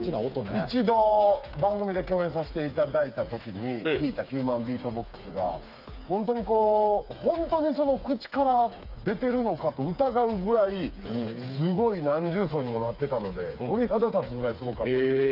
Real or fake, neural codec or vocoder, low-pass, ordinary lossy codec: fake; codec, 16 kHz, 4 kbps, FreqCodec, smaller model; 5.4 kHz; none